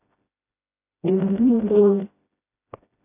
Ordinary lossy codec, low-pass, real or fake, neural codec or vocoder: AAC, 24 kbps; 3.6 kHz; fake; codec, 16 kHz, 0.5 kbps, FreqCodec, smaller model